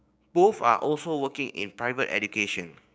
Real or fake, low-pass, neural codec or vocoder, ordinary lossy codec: fake; none; codec, 16 kHz, 6 kbps, DAC; none